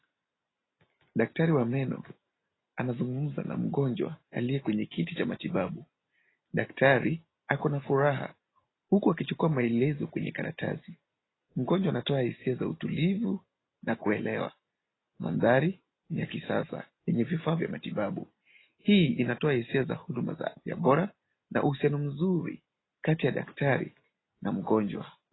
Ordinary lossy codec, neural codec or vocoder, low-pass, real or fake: AAC, 16 kbps; none; 7.2 kHz; real